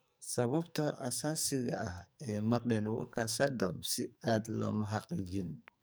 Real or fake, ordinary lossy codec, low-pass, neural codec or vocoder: fake; none; none; codec, 44.1 kHz, 2.6 kbps, SNAC